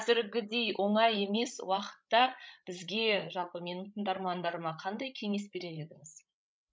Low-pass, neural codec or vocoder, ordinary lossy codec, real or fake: none; codec, 16 kHz, 16 kbps, FreqCodec, larger model; none; fake